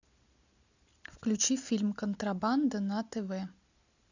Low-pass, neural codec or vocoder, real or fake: 7.2 kHz; none; real